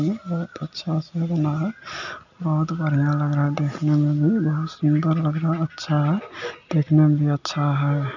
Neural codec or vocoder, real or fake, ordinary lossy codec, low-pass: none; real; none; 7.2 kHz